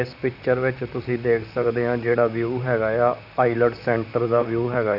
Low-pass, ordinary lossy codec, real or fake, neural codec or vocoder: 5.4 kHz; none; fake; vocoder, 44.1 kHz, 128 mel bands, Pupu-Vocoder